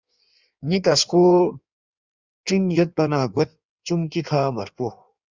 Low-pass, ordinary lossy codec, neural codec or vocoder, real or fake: 7.2 kHz; Opus, 64 kbps; codec, 16 kHz in and 24 kHz out, 1.1 kbps, FireRedTTS-2 codec; fake